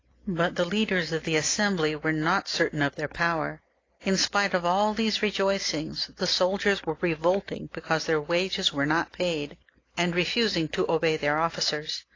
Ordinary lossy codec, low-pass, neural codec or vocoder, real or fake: AAC, 32 kbps; 7.2 kHz; none; real